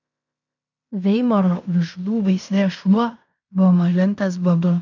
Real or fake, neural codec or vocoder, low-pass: fake; codec, 16 kHz in and 24 kHz out, 0.9 kbps, LongCat-Audio-Codec, fine tuned four codebook decoder; 7.2 kHz